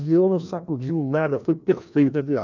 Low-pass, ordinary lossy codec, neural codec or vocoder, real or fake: 7.2 kHz; none; codec, 16 kHz, 1 kbps, FreqCodec, larger model; fake